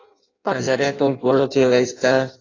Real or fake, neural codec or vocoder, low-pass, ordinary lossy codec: fake; codec, 16 kHz in and 24 kHz out, 0.6 kbps, FireRedTTS-2 codec; 7.2 kHz; AAC, 32 kbps